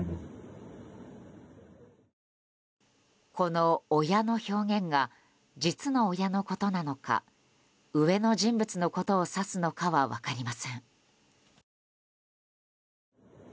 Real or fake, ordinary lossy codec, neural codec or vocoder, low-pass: real; none; none; none